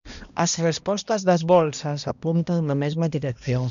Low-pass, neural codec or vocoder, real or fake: 7.2 kHz; codec, 16 kHz, 1 kbps, X-Codec, HuBERT features, trained on balanced general audio; fake